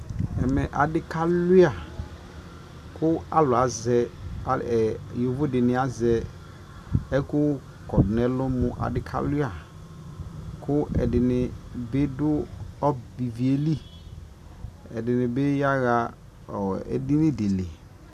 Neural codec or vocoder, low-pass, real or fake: none; 14.4 kHz; real